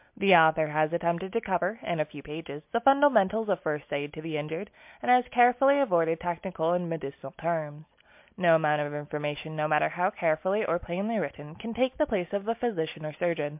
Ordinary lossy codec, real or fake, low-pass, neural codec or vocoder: MP3, 32 kbps; real; 3.6 kHz; none